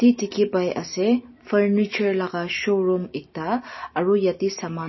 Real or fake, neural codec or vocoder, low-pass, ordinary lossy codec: real; none; 7.2 kHz; MP3, 24 kbps